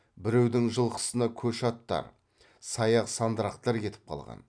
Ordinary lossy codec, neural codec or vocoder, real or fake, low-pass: none; none; real; 9.9 kHz